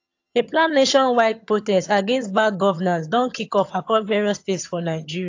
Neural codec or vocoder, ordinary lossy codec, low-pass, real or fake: vocoder, 22.05 kHz, 80 mel bands, HiFi-GAN; AAC, 48 kbps; 7.2 kHz; fake